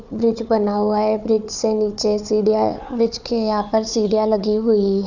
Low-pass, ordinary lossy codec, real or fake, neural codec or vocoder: 7.2 kHz; none; fake; codec, 16 kHz, 4 kbps, FunCodec, trained on Chinese and English, 50 frames a second